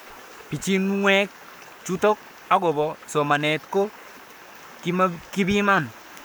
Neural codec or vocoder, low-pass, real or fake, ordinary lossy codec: codec, 44.1 kHz, 7.8 kbps, Pupu-Codec; none; fake; none